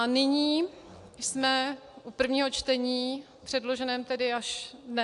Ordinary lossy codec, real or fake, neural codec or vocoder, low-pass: AAC, 64 kbps; real; none; 10.8 kHz